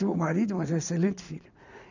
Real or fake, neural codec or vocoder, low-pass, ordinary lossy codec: fake; vocoder, 44.1 kHz, 80 mel bands, Vocos; 7.2 kHz; none